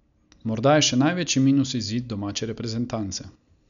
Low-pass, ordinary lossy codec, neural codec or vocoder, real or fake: 7.2 kHz; none; none; real